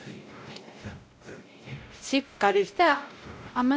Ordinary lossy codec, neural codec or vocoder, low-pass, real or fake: none; codec, 16 kHz, 0.5 kbps, X-Codec, WavLM features, trained on Multilingual LibriSpeech; none; fake